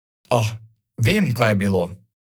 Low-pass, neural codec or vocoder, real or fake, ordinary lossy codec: none; codec, 44.1 kHz, 2.6 kbps, SNAC; fake; none